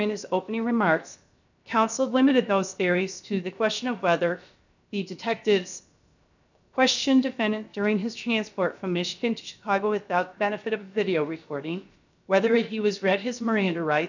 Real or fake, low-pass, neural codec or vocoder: fake; 7.2 kHz; codec, 16 kHz, 0.7 kbps, FocalCodec